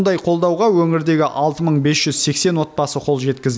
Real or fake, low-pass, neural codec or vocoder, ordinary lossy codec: real; none; none; none